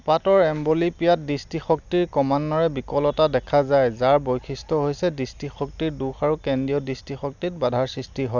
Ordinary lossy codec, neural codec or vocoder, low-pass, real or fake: none; none; 7.2 kHz; real